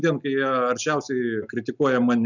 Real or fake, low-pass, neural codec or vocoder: real; 7.2 kHz; none